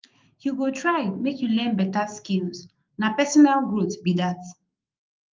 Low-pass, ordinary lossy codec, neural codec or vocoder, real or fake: 7.2 kHz; Opus, 24 kbps; autoencoder, 48 kHz, 128 numbers a frame, DAC-VAE, trained on Japanese speech; fake